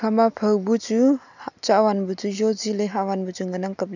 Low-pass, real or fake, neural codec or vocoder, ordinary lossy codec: 7.2 kHz; real; none; none